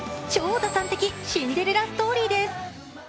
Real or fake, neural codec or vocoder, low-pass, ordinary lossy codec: real; none; none; none